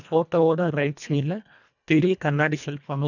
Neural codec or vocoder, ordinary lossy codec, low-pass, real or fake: codec, 24 kHz, 1.5 kbps, HILCodec; none; 7.2 kHz; fake